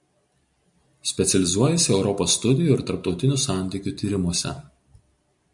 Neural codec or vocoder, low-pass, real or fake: none; 10.8 kHz; real